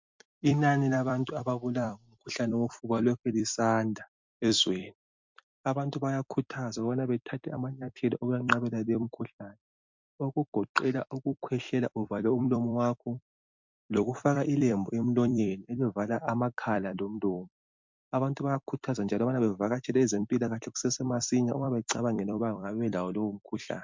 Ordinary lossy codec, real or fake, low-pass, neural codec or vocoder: MP3, 64 kbps; fake; 7.2 kHz; vocoder, 44.1 kHz, 128 mel bands every 256 samples, BigVGAN v2